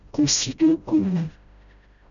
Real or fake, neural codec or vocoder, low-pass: fake; codec, 16 kHz, 0.5 kbps, FreqCodec, smaller model; 7.2 kHz